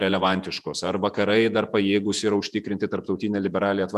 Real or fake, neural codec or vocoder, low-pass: fake; vocoder, 48 kHz, 128 mel bands, Vocos; 14.4 kHz